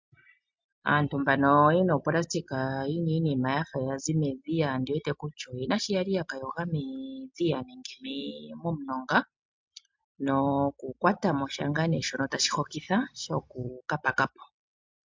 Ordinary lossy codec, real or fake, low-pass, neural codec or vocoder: MP3, 64 kbps; real; 7.2 kHz; none